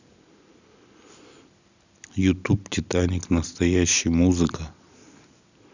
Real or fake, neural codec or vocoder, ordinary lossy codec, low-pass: real; none; none; 7.2 kHz